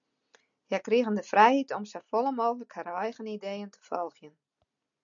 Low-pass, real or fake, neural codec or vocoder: 7.2 kHz; real; none